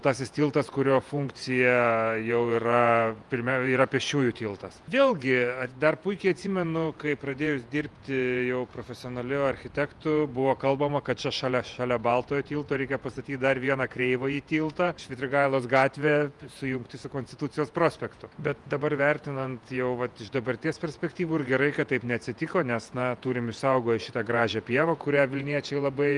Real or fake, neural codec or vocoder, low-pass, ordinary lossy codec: fake; vocoder, 48 kHz, 128 mel bands, Vocos; 10.8 kHz; Opus, 32 kbps